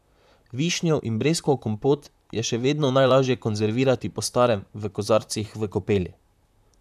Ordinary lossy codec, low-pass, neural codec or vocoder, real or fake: none; 14.4 kHz; vocoder, 44.1 kHz, 128 mel bands, Pupu-Vocoder; fake